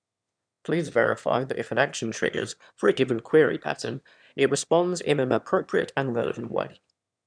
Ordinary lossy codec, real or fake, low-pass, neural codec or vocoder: none; fake; 9.9 kHz; autoencoder, 22.05 kHz, a latent of 192 numbers a frame, VITS, trained on one speaker